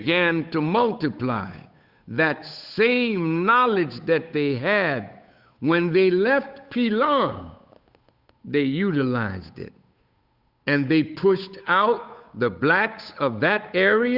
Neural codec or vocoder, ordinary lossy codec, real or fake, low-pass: codec, 16 kHz, 8 kbps, FunCodec, trained on Chinese and English, 25 frames a second; Opus, 64 kbps; fake; 5.4 kHz